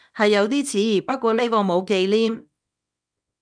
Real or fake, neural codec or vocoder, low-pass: fake; codec, 24 kHz, 0.9 kbps, WavTokenizer, small release; 9.9 kHz